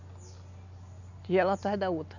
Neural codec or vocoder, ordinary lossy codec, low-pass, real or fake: none; none; 7.2 kHz; real